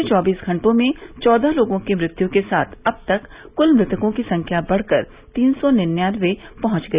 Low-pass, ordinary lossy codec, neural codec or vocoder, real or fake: 3.6 kHz; Opus, 64 kbps; none; real